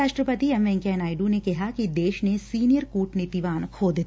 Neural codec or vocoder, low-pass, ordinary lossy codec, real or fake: none; none; none; real